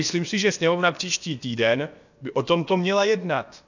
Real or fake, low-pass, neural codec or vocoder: fake; 7.2 kHz; codec, 16 kHz, about 1 kbps, DyCAST, with the encoder's durations